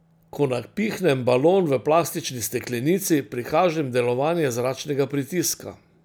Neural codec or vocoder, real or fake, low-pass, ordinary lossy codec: none; real; none; none